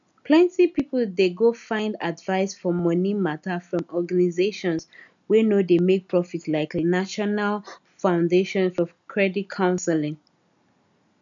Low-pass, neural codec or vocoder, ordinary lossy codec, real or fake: 7.2 kHz; none; none; real